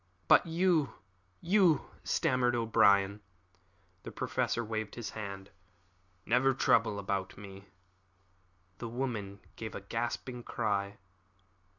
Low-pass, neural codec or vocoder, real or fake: 7.2 kHz; none; real